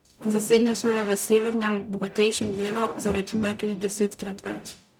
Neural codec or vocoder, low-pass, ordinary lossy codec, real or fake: codec, 44.1 kHz, 0.9 kbps, DAC; 19.8 kHz; none; fake